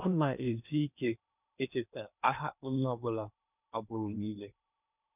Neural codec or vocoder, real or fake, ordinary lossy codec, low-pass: codec, 16 kHz in and 24 kHz out, 0.8 kbps, FocalCodec, streaming, 65536 codes; fake; none; 3.6 kHz